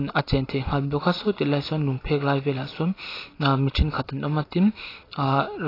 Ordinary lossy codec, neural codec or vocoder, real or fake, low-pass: AAC, 24 kbps; none; real; 5.4 kHz